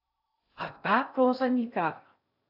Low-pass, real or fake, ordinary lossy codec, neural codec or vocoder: 5.4 kHz; fake; AAC, 48 kbps; codec, 16 kHz in and 24 kHz out, 0.6 kbps, FocalCodec, streaming, 2048 codes